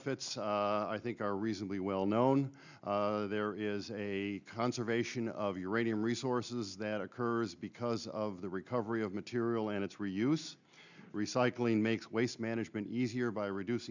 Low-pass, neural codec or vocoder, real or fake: 7.2 kHz; none; real